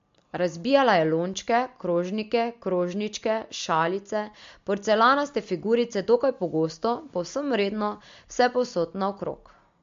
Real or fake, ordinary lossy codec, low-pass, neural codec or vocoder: real; MP3, 48 kbps; 7.2 kHz; none